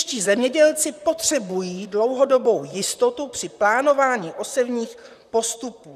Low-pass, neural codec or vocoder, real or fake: 14.4 kHz; vocoder, 44.1 kHz, 128 mel bands, Pupu-Vocoder; fake